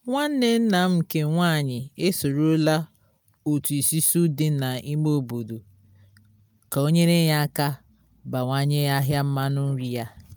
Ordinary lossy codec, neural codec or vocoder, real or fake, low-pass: none; none; real; none